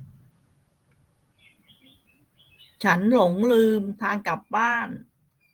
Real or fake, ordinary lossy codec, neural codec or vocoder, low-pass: fake; Opus, 24 kbps; vocoder, 48 kHz, 128 mel bands, Vocos; 19.8 kHz